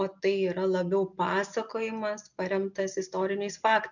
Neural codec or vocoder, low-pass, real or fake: none; 7.2 kHz; real